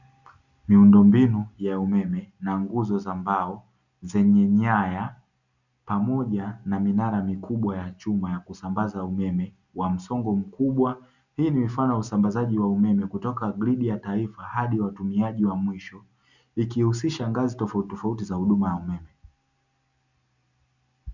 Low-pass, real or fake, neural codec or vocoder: 7.2 kHz; real; none